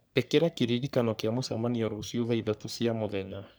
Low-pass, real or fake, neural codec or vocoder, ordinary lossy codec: none; fake; codec, 44.1 kHz, 3.4 kbps, Pupu-Codec; none